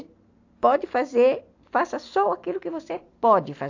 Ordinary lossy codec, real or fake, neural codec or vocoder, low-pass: AAC, 48 kbps; real; none; 7.2 kHz